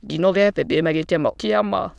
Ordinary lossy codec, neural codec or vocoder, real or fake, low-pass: none; autoencoder, 22.05 kHz, a latent of 192 numbers a frame, VITS, trained on many speakers; fake; none